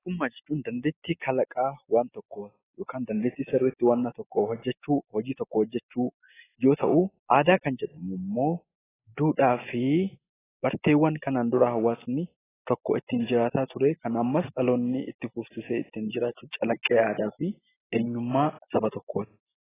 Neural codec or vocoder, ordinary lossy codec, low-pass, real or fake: none; AAC, 16 kbps; 3.6 kHz; real